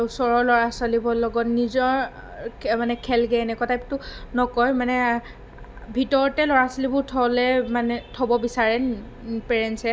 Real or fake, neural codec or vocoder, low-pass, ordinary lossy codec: real; none; none; none